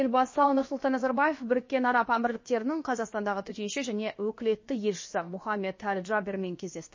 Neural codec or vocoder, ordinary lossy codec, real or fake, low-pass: codec, 16 kHz, about 1 kbps, DyCAST, with the encoder's durations; MP3, 32 kbps; fake; 7.2 kHz